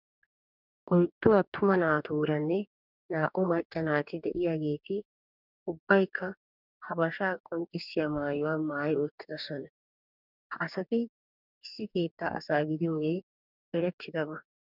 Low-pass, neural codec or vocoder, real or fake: 5.4 kHz; codec, 44.1 kHz, 2.6 kbps, DAC; fake